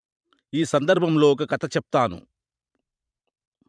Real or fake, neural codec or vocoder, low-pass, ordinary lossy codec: fake; vocoder, 44.1 kHz, 128 mel bands every 512 samples, BigVGAN v2; 9.9 kHz; none